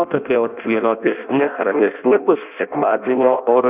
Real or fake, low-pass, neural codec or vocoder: fake; 3.6 kHz; codec, 16 kHz in and 24 kHz out, 0.6 kbps, FireRedTTS-2 codec